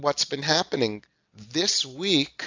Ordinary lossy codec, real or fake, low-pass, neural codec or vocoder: AAC, 48 kbps; real; 7.2 kHz; none